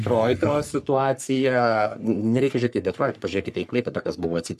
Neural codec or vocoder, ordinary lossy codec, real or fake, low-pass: codec, 44.1 kHz, 3.4 kbps, Pupu-Codec; MP3, 96 kbps; fake; 14.4 kHz